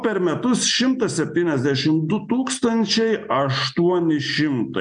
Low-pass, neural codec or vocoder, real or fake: 9.9 kHz; none; real